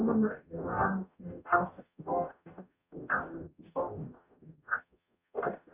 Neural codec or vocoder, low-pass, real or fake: codec, 44.1 kHz, 0.9 kbps, DAC; 3.6 kHz; fake